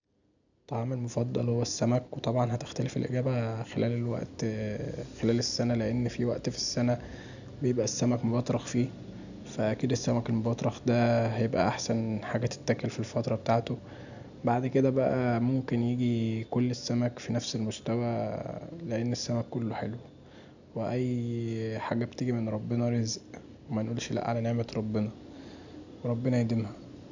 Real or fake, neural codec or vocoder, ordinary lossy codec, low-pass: real; none; none; 7.2 kHz